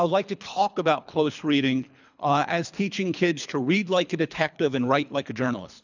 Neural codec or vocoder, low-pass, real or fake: codec, 24 kHz, 3 kbps, HILCodec; 7.2 kHz; fake